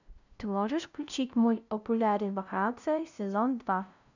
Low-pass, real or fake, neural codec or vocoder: 7.2 kHz; fake; codec, 16 kHz, 0.5 kbps, FunCodec, trained on LibriTTS, 25 frames a second